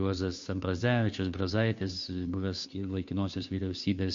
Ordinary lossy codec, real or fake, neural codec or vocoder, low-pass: MP3, 48 kbps; fake; codec, 16 kHz, 2 kbps, FunCodec, trained on Chinese and English, 25 frames a second; 7.2 kHz